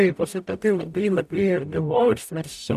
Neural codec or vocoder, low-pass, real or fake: codec, 44.1 kHz, 0.9 kbps, DAC; 14.4 kHz; fake